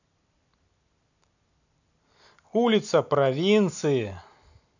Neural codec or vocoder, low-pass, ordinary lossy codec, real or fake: none; 7.2 kHz; none; real